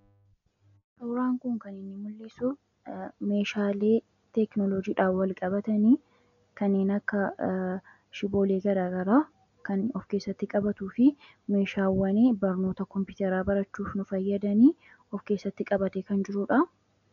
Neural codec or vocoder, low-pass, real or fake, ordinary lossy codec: none; 7.2 kHz; real; MP3, 96 kbps